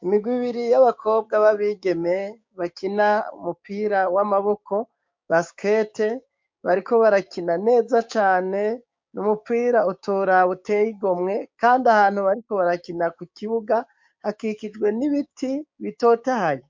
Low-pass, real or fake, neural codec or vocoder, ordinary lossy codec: 7.2 kHz; fake; codec, 16 kHz, 6 kbps, DAC; MP3, 48 kbps